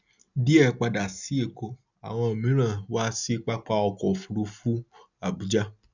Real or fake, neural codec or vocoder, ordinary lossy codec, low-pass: real; none; none; 7.2 kHz